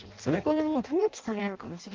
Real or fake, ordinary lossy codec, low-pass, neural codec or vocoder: fake; Opus, 24 kbps; 7.2 kHz; codec, 16 kHz in and 24 kHz out, 0.6 kbps, FireRedTTS-2 codec